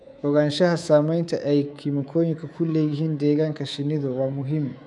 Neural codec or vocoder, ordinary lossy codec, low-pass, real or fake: codec, 24 kHz, 3.1 kbps, DualCodec; none; 10.8 kHz; fake